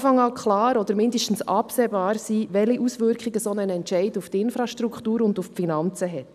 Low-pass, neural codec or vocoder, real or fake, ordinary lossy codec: 14.4 kHz; none; real; none